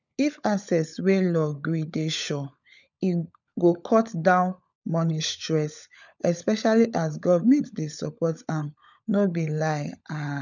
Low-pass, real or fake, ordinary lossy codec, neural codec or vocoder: 7.2 kHz; fake; none; codec, 16 kHz, 16 kbps, FunCodec, trained on LibriTTS, 50 frames a second